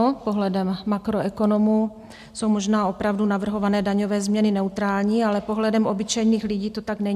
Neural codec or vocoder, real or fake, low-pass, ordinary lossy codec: none; real; 14.4 kHz; MP3, 96 kbps